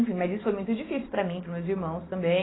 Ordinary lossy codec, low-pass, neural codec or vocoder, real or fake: AAC, 16 kbps; 7.2 kHz; none; real